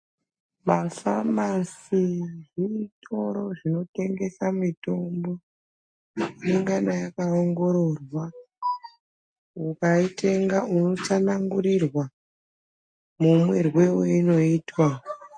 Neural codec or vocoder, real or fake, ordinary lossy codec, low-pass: none; real; MP3, 48 kbps; 9.9 kHz